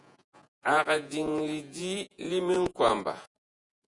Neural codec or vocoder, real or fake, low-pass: vocoder, 48 kHz, 128 mel bands, Vocos; fake; 10.8 kHz